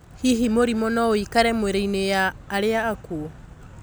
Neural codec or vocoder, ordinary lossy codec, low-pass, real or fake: none; none; none; real